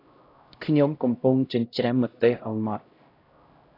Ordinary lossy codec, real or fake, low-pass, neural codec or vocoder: AAC, 32 kbps; fake; 5.4 kHz; codec, 16 kHz, 0.5 kbps, X-Codec, HuBERT features, trained on LibriSpeech